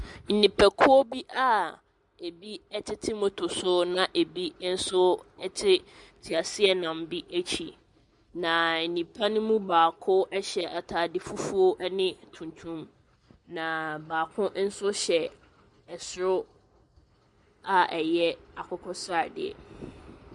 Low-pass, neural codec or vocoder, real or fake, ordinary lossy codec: 10.8 kHz; none; real; MP3, 96 kbps